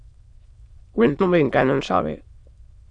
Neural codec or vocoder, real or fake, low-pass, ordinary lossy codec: autoencoder, 22.05 kHz, a latent of 192 numbers a frame, VITS, trained on many speakers; fake; 9.9 kHz; Opus, 64 kbps